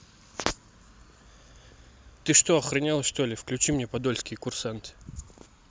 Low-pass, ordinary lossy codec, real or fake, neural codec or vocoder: none; none; real; none